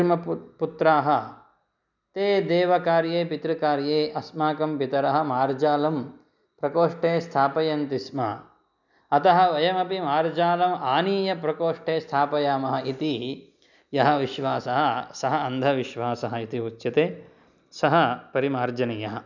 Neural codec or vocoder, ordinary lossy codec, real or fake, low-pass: none; none; real; 7.2 kHz